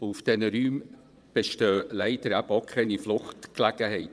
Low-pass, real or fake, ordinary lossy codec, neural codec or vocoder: none; fake; none; vocoder, 22.05 kHz, 80 mel bands, Vocos